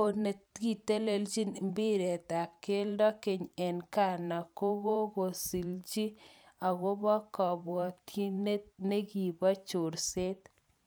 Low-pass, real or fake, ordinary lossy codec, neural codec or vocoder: none; fake; none; vocoder, 44.1 kHz, 128 mel bands every 512 samples, BigVGAN v2